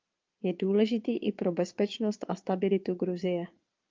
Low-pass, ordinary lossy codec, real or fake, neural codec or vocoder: 7.2 kHz; Opus, 32 kbps; fake; vocoder, 22.05 kHz, 80 mel bands, Vocos